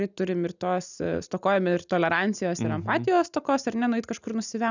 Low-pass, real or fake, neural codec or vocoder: 7.2 kHz; real; none